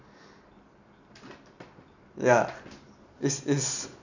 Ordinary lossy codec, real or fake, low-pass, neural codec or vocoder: none; real; 7.2 kHz; none